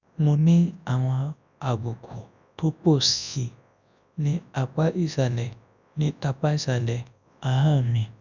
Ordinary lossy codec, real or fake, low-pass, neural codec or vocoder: none; fake; 7.2 kHz; codec, 24 kHz, 0.9 kbps, WavTokenizer, large speech release